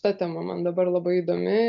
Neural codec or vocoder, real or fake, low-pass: none; real; 7.2 kHz